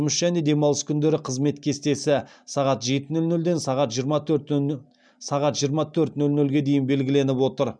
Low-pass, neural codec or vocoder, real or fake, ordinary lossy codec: 9.9 kHz; none; real; none